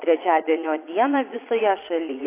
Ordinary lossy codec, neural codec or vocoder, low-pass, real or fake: AAC, 24 kbps; vocoder, 22.05 kHz, 80 mel bands, Vocos; 3.6 kHz; fake